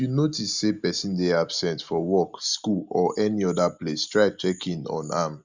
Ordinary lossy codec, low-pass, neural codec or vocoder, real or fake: Opus, 64 kbps; 7.2 kHz; none; real